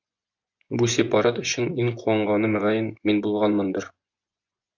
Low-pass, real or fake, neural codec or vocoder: 7.2 kHz; fake; vocoder, 24 kHz, 100 mel bands, Vocos